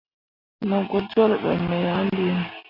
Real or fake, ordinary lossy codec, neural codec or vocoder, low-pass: real; MP3, 32 kbps; none; 5.4 kHz